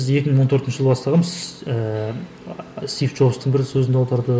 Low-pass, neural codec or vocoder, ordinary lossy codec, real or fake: none; none; none; real